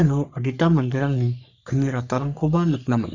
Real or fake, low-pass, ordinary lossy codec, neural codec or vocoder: fake; 7.2 kHz; none; codec, 44.1 kHz, 3.4 kbps, Pupu-Codec